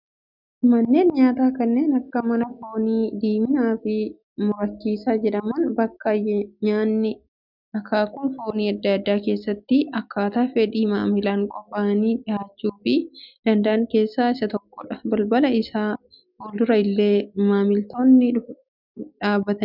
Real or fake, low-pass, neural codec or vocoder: real; 5.4 kHz; none